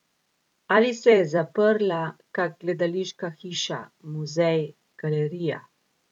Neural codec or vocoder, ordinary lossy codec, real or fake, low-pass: vocoder, 44.1 kHz, 128 mel bands every 256 samples, BigVGAN v2; none; fake; 19.8 kHz